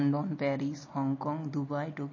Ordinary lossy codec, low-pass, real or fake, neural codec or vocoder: MP3, 32 kbps; 7.2 kHz; real; none